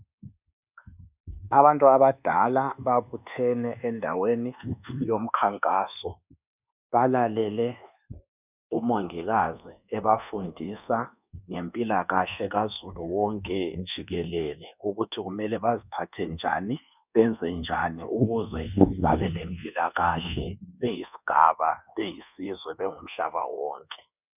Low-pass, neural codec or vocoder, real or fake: 3.6 kHz; codec, 24 kHz, 1.2 kbps, DualCodec; fake